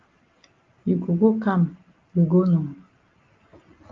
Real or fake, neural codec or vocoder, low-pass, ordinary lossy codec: real; none; 7.2 kHz; Opus, 32 kbps